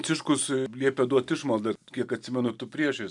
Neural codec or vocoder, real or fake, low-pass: none; real; 10.8 kHz